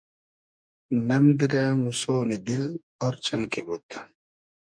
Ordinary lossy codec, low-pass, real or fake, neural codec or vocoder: MP3, 96 kbps; 9.9 kHz; fake; codec, 44.1 kHz, 2.6 kbps, DAC